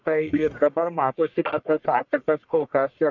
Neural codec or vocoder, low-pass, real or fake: codec, 44.1 kHz, 1.7 kbps, Pupu-Codec; 7.2 kHz; fake